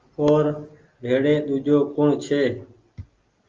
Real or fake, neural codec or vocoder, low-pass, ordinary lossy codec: real; none; 7.2 kHz; Opus, 32 kbps